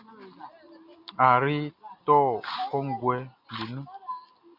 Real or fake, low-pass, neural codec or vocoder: real; 5.4 kHz; none